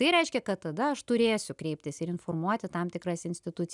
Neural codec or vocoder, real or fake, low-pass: none; real; 10.8 kHz